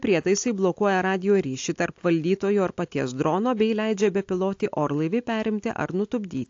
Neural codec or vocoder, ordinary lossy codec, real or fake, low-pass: none; AAC, 48 kbps; real; 7.2 kHz